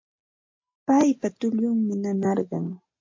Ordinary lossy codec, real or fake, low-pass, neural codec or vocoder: MP3, 48 kbps; real; 7.2 kHz; none